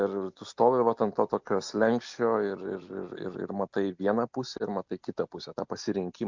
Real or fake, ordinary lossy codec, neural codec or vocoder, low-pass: real; MP3, 64 kbps; none; 7.2 kHz